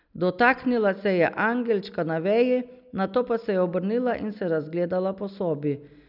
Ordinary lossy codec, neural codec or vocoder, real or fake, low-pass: none; none; real; 5.4 kHz